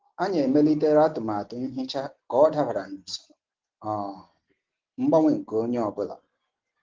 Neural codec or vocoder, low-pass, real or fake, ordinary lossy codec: none; 7.2 kHz; real; Opus, 16 kbps